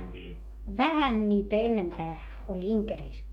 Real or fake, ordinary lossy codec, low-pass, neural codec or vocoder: fake; none; 19.8 kHz; codec, 44.1 kHz, 2.6 kbps, DAC